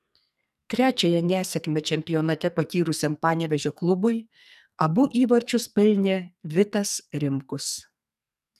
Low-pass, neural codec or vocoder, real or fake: 14.4 kHz; codec, 44.1 kHz, 2.6 kbps, SNAC; fake